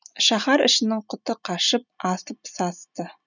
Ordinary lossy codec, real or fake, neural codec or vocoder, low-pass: none; real; none; 7.2 kHz